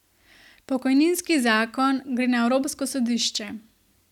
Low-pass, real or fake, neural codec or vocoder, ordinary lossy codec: 19.8 kHz; real; none; none